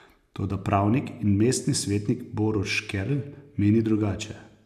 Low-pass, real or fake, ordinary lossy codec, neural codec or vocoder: 14.4 kHz; real; none; none